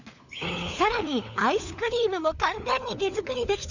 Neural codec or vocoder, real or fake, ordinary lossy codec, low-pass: codec, 16 kHz, 4 kbps, FunCodec, trained on LibriTTS, 50 frames a second; fake; none; 7.2 kHz